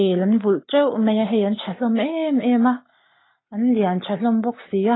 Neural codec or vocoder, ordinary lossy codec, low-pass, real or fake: codec, 44.1 kHz, 7.8 kbps, Pupu-Codec; AAC, 16 kbps; 7.2 kHz; fake